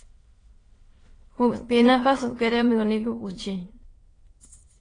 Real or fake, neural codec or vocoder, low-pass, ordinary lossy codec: fake; autoencoder, 22.05 kHz, a latent of 192 numbers a frame, VITS, trained on many speakers; 9.9 kHz; AAC, 32 kbps